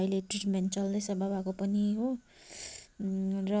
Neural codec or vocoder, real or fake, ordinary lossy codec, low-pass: none; real; none; none